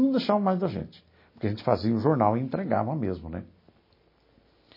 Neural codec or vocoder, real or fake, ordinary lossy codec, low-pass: none; real; MP3, 24 kbps; 5.4 kHz